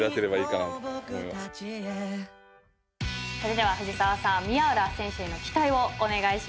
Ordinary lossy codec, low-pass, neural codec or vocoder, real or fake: none; none; none; real